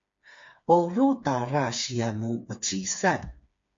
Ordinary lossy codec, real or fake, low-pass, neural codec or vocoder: MP3, 48 kbps; fake; 7.2 kHz; codec, 16 kHz, 4 kbps, FreqCodec, smaller model